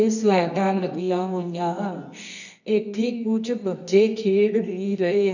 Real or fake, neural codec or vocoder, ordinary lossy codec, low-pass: fake; codec, 24 kHz, 0.9 kbps, WavTokenizer, medium music audio release; none; 7.2 kHz